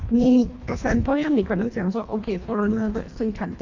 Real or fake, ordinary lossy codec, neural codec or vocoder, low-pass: fake; AAC, 48 kbps; codec, 24 kHz, 1.5 kbps, HILCodec; 7.2 kHz